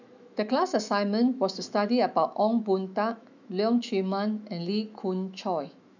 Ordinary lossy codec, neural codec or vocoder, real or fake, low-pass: none; autoencoder, 48 kHz, 128 numbers a frame, DAC-VAE, trained on Japanese speech; fake; 7.2 kHz